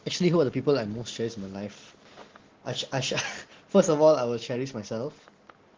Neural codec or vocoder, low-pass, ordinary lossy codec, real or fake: none; 7.2 kHz; Opus, 16 kbps; real